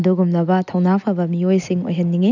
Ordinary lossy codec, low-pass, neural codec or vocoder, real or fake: none; 7.2 kHz; none; real